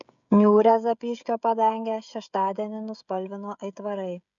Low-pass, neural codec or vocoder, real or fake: 7.2 kHz; codec, 16 kHz, 16 kbps, FreqCodec, smaller model; fake